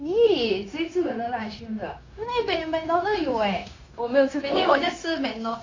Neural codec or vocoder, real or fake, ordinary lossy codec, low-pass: codec, 16 kHz, 0.9 kbps, LongCat-Audio-Codec; fake; AAC, 32 kbps; 7.2 kHz